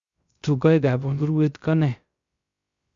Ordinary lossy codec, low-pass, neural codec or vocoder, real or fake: Opus, 64 kbps; 7.2 kHz; codec, 16 kHz, 0.3 kbps, FocalCodec; fake